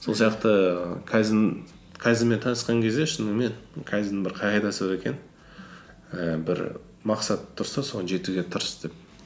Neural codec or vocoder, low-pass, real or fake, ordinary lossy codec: none; none; real; none